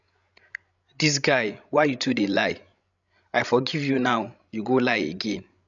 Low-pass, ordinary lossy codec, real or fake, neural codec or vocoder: 7.2 kHz; none; fake; codec, 16 kHz, 16 kbps, FreqCodec, larger model